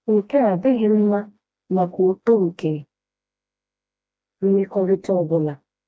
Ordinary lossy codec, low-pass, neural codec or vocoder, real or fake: none; none; codec, 16 kHz, 1 kbps, FreqCodec, smaller model; fake